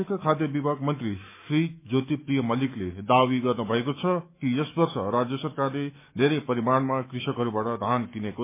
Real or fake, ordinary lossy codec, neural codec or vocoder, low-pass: fake; MP3, 24 kbps; autoencoder, 48 kHz, 128 numbers a frame, DAC-VAE, trained on Japanese speech; 3.6 kHz